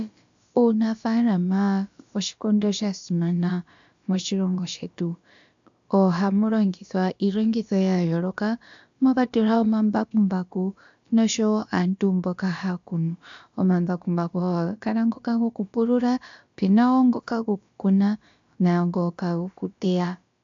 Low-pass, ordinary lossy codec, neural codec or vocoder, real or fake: 7.2 kHz; AAC, 64 kbps; codec, 16 kHz, about 1 kbps, DyCAST, with the encoder's durations; fake